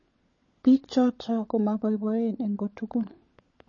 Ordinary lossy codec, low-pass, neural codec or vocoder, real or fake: MP3, 32 kbps; 7.2 kHz; codec, 16 kHz, 16 kbps, FunCodec, trained on LibriTTS, 50 frames a second; fake